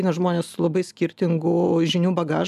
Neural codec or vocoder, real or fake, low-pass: none; real; 14.4 kHz